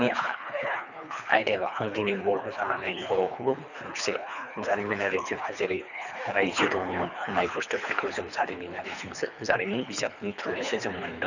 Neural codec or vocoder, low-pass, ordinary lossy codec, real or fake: codec, 24 kHz, 3 kbps, HILCodec; 7.2 kHz; none; fake